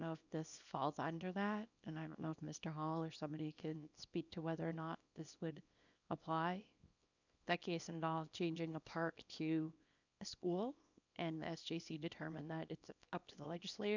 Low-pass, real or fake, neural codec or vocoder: 7.2 kHz; fake; codec, 24 kHz, 0.9 kbps, WavTokenizer, small release